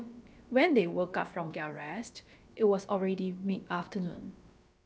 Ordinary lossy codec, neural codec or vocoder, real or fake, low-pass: none; codec, 16 kHz, about 1 kbps, DyCAST, with the encoder's durations; fake; none